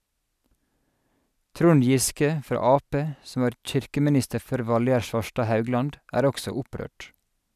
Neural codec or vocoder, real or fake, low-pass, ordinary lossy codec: none; real; 14.4 kHz; none